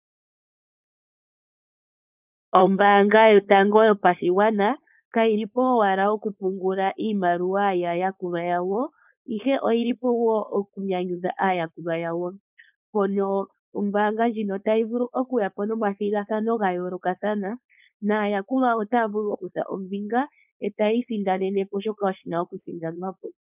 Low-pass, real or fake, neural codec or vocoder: 3.6 kHz; fake; codec, 16 kHz, 4.8 kbps, FACodec